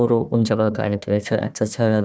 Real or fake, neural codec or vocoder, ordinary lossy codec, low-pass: fake; codec, 16 kHz, 1 kbps, FunCodec, trained on Chinese and English, 50 frames a second; none; none